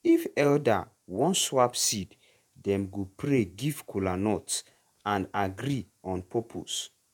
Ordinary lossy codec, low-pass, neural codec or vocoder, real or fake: none; 19.8 kHz; vocoder, 48 kHz, 128 mel bands, Vocos; fake